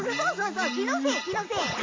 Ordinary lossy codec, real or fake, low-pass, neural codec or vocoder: AAC, 32 kbps; real; 7.2 kHz; none